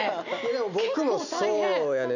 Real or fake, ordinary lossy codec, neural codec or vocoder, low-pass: real; none; none; 7.2 kHz